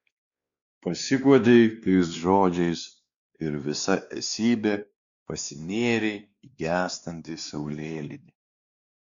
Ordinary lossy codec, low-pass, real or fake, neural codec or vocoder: Opus, 64 kbps; 7.2 kHz; fake; codec, 16 kHz, 2 kbps, X-Codec, WavLM features, trained on Multilingual LibriSpeech